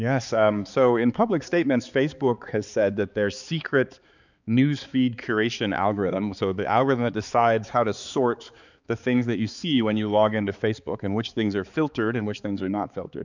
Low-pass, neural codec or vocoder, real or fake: 7.2 kHz; codec, 16 kHz, 4 kbps, X-Codec, HuBERT features, trained on balanced general audio; fake